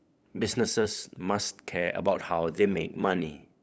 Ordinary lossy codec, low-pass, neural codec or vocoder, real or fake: none; none; codec, 16 kHz, 8 kbps, FunCodec, trained on LibriTTS, 25 frames a second; fake